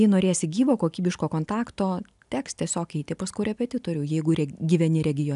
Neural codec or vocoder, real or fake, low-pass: none; real; 10.8 kHz